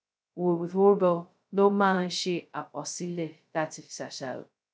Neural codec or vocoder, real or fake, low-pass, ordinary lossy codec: codec, 16 kHz, 0.2 kbps, FocalCodec; fake; none; none